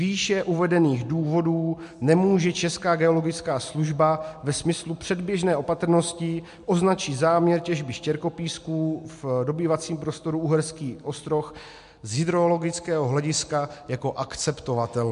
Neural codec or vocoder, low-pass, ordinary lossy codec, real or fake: none; 10.8 kHz; MP3, 64 kbps; real